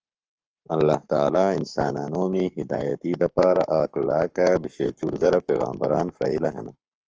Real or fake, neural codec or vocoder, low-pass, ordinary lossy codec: fake; codec, 44.1 kHz, 7.8 kbps, Pupu-Codec; 7.2 kHz; Opus, 24 kbps